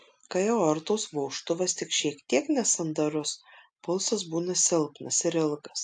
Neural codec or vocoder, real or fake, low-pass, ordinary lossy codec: none; real; 9.9 kHz; AAC, 64 kbps